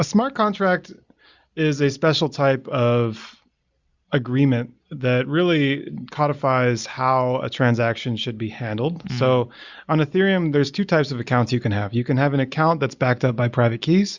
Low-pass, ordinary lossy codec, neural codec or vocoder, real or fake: 7.2 kHz; Opus, 64 kbps; none; real